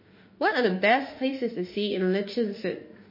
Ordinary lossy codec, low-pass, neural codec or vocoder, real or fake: MP3, 24 kbps; 5.4 kHz; autoencoder, 48 kHz, 32 numbers a frame, DAC-VAE, trained on Japanese speech; fake